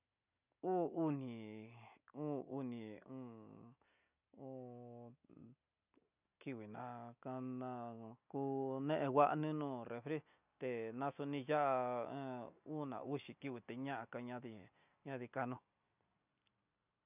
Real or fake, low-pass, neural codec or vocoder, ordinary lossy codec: real; 3.6 kHz; none; none